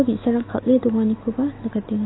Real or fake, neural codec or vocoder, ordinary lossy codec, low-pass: real; none; AAC, 16 kbps; 7.2 kHz